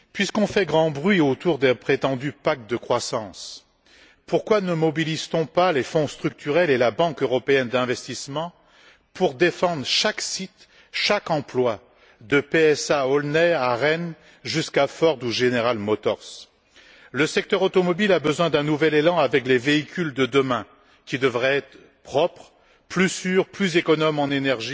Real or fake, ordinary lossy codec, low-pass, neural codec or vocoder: real; none; none; none